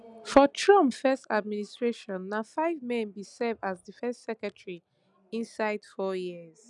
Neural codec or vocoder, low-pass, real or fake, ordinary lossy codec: none; 10.8 kHz; real; none